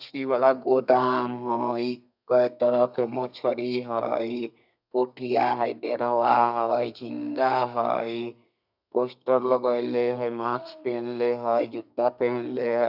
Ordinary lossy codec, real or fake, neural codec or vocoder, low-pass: none; fake; codec, 32 kHz, 1.9 kbps, SNAC; 5.4 kHz